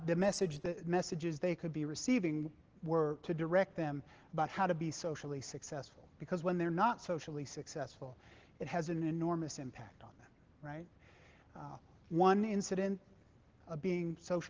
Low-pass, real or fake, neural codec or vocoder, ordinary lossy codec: 7.2 kHz; real; none; Opus, 16 kbps